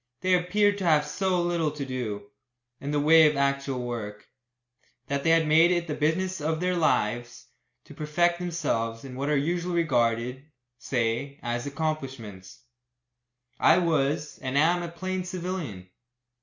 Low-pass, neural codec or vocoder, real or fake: 7.2 kHz; none; real